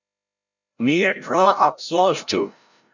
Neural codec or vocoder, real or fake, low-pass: codec, 16 kHz, 0.5 kbps, FreqCodec, larger model; fake; 7.2 kHz